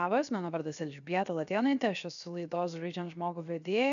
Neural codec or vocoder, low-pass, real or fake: codec, 16 kHz, 0.7 kbps, FocalCodec; 7.2 kHz; fake